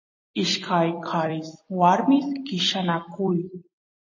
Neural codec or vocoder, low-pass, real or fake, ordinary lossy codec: vocoder, 44.1 kHz, 128 mel bands every 256 samples, BigVGAN v2; 7.2 kHz; fake; MP3, 32 kbps